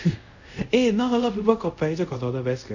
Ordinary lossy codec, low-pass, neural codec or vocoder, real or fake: none; 7.2 kHz; codec, 24 kHz, 0.5 kbps, DualCodec; fake